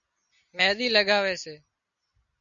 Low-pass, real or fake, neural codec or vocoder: 7.2 kHz; real; none